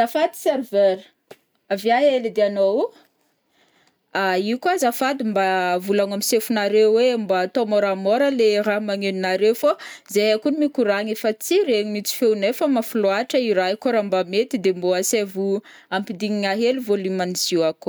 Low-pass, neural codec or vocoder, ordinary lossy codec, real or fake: none; none; none; real